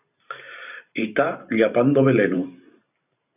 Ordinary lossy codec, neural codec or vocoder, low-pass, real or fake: AAC, 32 kbps; vocoder, 44.1 kHz, 128 mel bands every 512 samples, BigVGAN v2; 3.6 kHz; fake